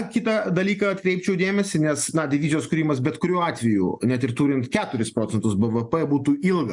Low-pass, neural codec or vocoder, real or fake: 10.8 kHz; none; real